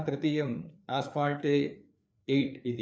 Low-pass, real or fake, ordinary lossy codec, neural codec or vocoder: none; fake; none; codec, 16 kHz, 4 kbps, FreqCodec, larger model